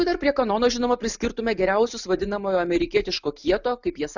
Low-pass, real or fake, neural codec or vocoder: 7.2 kHz; real; none